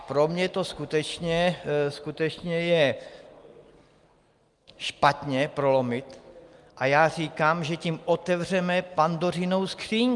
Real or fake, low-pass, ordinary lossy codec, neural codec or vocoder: real; 10.8 kHz; Opus, 32 kbps; none